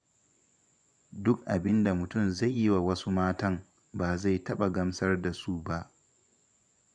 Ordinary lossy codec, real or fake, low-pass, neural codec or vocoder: none; fake; 9.9 kHz; vocoder, 24 kHz, 100 mel bands, Vocos